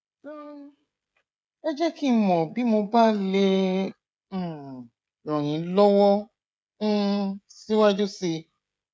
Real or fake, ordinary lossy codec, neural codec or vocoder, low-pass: fake; none; codec, 16 kHz, 16 kbps, FreqCodec, smaller model; none